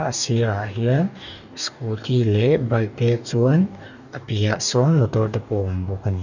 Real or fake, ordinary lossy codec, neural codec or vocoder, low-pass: fake; none; codec, 44.1 kHz, 2.6 kbps, DAC; 7.2 kHz